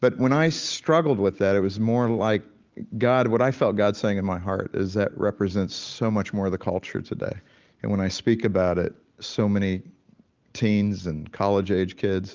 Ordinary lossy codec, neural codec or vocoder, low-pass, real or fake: Opus, 32 kbps; none; 7.2 kHz; real